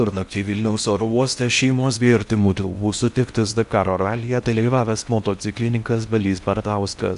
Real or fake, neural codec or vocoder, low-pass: fake; codec, 16 kHz in and 24 kHz out, 0.6 kbps, FocalCodec, streaming, 4096 codes; 10.8 kHz